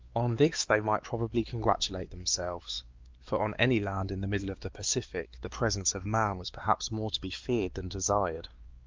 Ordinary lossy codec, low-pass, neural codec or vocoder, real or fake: Opus, 32 kbps; 7.2 kHz; codec, 16 kHz, 2 kbps, X-Codec, WavLM features, trained on Multilingual LibriSpeech; fake